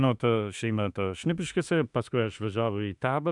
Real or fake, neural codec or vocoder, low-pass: fake; autoencoder, 48 kHz, 32 numbers a frame, DAC-VAE, trained on Japanese speech; 10.8 kHz